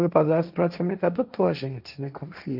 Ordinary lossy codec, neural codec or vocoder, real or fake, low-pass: none; codec, 16 kHz, 1.1 kbps, Voila-Tokenizer; fake; 5.4 kHz